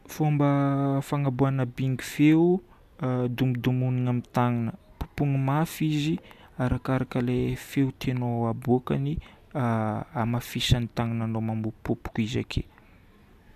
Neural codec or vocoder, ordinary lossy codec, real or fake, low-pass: none; none; real; 14.4 kHz